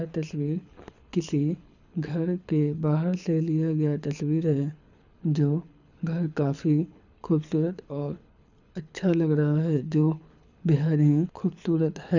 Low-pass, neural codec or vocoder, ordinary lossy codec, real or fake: 7.2 kHz; codec, 24 kHz, 6 kbps, HILCodec; none; fake